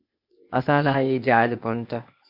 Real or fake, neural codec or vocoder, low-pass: fake; codec, 16 kHz, 0.8 kbps, ZipCodec; 5.4 kHz